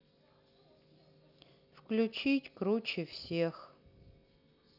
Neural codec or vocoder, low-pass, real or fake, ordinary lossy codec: none; 5.4 kHz; real; none